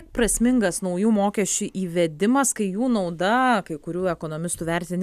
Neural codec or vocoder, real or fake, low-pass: none; real; 14.4 kHz